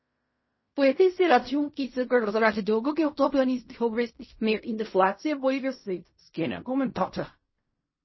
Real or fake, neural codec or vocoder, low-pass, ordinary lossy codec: fake; codec, 16 kHz in and 24 kHz out, 0.4 kbps, LongCat-Audio-Codec, fine tuned four codebook decoder; 7.2 kHz; MP3, 24 kbps